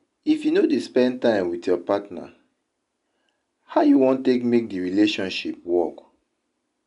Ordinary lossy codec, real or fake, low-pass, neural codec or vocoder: MP3, 96 kbps; real; 10.8 kHz; none